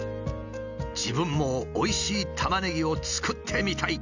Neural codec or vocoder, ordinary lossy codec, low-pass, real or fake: none; none; 7.2 kHz; real